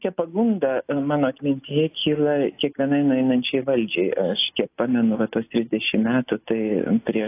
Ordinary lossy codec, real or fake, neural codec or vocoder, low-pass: AAC, 24 kbps; real; none; 3.6 kHz